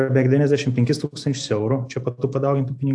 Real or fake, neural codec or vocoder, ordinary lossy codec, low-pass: real; none; AAC, 64 kbps; 9.9 kHz